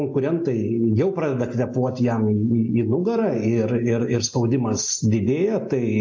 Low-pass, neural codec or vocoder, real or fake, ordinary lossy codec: 7.2 kHz; none; real; AAC, 48 kbps